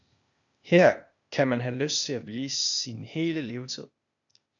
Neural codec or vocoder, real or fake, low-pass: codec, 16 kHz, 0.8 kbps, ZipCodec; fake; 7.2 kHz